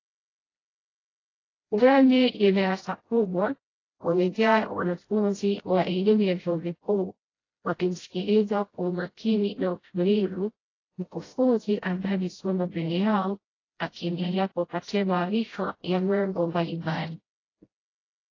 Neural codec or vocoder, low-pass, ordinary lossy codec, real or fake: codec, 16 kHz, 0.5 kbps, FreqCodec, smaller model; 7.2 kHz; AAC, 32 kbps; fake